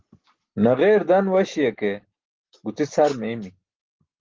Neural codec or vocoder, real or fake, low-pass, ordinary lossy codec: none; real; 7.2 kHz; Opus, 16 kbps